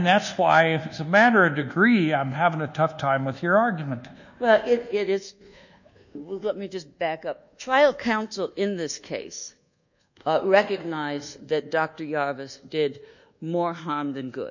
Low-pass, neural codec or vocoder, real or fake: 7.2 kHz; codec, 24 kHz, 1.2 kbps, DualCodec; fake